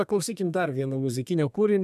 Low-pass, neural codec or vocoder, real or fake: 14.4 kHz; codec, 32 kHz, 1.9 kbps, SNAC; fake